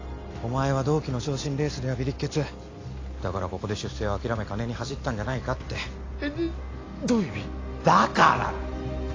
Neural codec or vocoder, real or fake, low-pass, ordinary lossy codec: none; real; 7.2 kHz; AAC, 32 kbps